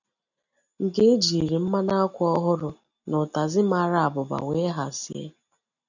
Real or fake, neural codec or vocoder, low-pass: real; none; 7.2 kHz